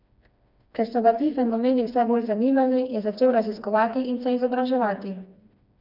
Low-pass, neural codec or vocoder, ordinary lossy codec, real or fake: 5.4 kHz; codec, 16 kHz, 2 kbps, FreqCodec, smaller model; none; fake